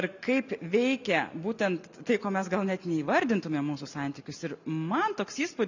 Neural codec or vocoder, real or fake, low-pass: none; real; 7.2 kHz